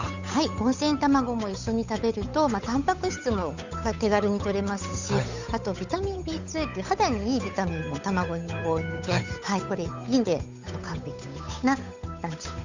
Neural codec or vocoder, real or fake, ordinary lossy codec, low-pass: codec, 16 kHz, 8 kbps, FunCodec, trained on Chinese and English, 25 frames a second; fake; Opus, 64 kbps; 7.2 kHz